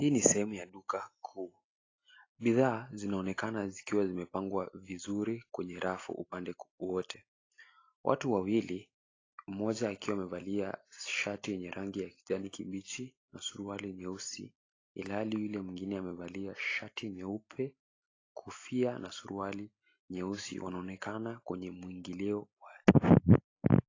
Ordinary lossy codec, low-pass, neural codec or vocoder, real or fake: AAC, 32 kbps; 7.2 kHz; none; real